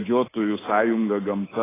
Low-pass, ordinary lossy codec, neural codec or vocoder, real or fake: 3.6 kHz; AAC, 16 kbps; codec, 24 kHz, 3.1 kbps, DualCodec; fake